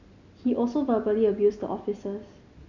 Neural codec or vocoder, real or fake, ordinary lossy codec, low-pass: none; real; none; 7.2 kHz